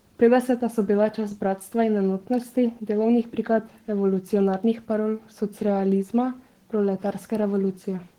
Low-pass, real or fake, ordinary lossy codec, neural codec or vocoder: 19.8 kHz; fake; Opus, 16 kbps; codec, 44.1 kHz, 7.8 kbps, Pupu-Codec